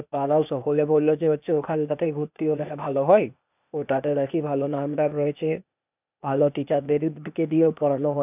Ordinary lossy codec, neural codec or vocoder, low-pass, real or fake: none; codec, 16 kHz, 0.8 kbps, ZipCodec; 3.6 kHz; fake